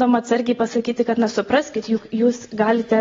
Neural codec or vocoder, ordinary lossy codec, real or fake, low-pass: none; AAC, 24 kbps; real; 7.2 kHz